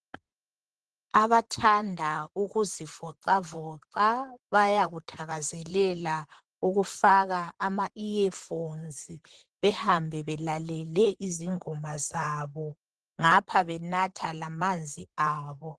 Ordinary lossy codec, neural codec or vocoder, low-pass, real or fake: Opus, 16 kbps; vocoder, 44.1 kHz, 128 mel bands, Pupu-Vocoder; 10.8 kHz; fake